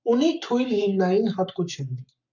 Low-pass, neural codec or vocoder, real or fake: 7.2 kHz; codec, 44.1 kHz, 7.8 kbps, Pupu-Codec; fake